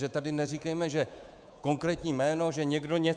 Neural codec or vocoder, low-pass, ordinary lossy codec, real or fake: codec, 24 kHz, 3.1 kbps, DualCodec; 9.9 kHz; Opus, 64 kbps; fake